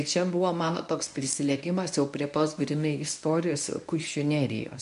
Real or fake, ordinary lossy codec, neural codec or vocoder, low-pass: fake; MP3, 64 kbps; codec, 24 kHz, 0.9 kbps, WavTokenizer, medium speech release version 1; 10.8 kHz